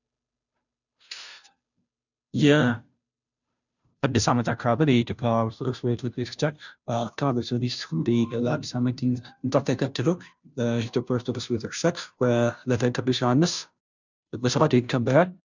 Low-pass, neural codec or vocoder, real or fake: 7.2 kHz; codec, 16 kHz, 0.5 kbps, FunCodec, trained on Chinese and English, 25 frames a second; fake